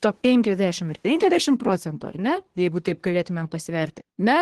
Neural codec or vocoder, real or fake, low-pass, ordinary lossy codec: codec, 24 kHz, 1 kbps, SNAC; fake; 10.8 kHz; Opus, 16 kbps